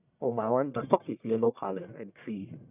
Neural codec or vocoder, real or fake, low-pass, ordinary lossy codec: codec, 44.1 kHz, 1.7 kbps, Pupu-Codec; fake; 3.6 kHz; none